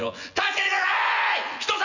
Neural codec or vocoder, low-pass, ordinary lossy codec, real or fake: vocoder, 24 kHz, 100 mel bands, Vocos; 7.2 kHz; none; fake